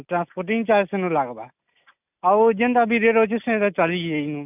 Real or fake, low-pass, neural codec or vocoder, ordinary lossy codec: real; 3.6 kHz; none; none